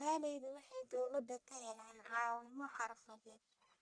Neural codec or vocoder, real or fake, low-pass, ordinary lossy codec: codec, 44.1 kHz, 1.7 kbps, Pupu-Codec; fake; 9.9 kHz; none